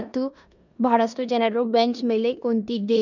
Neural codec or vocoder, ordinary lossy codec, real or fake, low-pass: codec, 16 kHz in and 24 kHz out, 0.9 kbps, LongCat-Audio-Codec, four codebook decoder; none; fake; 7.2 kHz